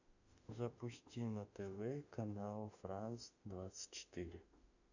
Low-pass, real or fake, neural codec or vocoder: 7.2 kHz; fake; autoencoder, 48 kHz, 32 numbers a frame, DAC-VAE, trained on Japanese speech